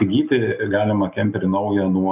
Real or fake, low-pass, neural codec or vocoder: real; 3.6 kHz; none